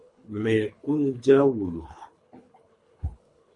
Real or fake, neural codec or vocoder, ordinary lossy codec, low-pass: fake; codec, 24 kHz, 3 kbps, HILCodec; MP3, 48 kbps; 10.8 kHz